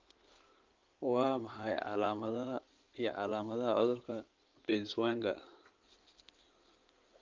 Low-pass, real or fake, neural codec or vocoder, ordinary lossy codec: 7.2 kHz; fake; codec, 16 kHz in and 24 kHz out, 2.2 kbps, FireRedTTS-2 codec; Opus, 24 kbps